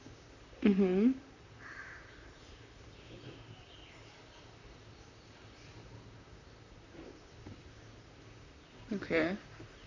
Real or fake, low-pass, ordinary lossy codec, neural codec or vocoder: fake; 7.2 kHz; none; vocoder, 44.1 kHz, 128 mel bands, Pupu-Vocoder